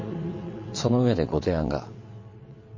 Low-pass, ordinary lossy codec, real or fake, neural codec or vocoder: 7.2 kHz; MP3, 32 kbps; fake; vocoder, 22.05 kHz, 80 mel bands, WaveNeXt